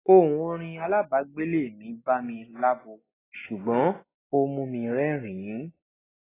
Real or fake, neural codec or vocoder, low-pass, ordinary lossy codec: real; none; 3.6 kHz; AAC, 16 kbps